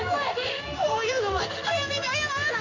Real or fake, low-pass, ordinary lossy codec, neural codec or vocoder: fake; 7.2 kHz; none; codec, 16 kHz in and 24 kHz out, 1 kbps, XY-Tokenizer